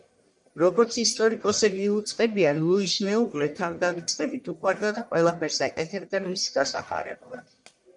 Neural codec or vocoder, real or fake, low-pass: codec, 44.1 kHz, 1.7 kbps, Pupu-Codec; fake; 10.8 kHz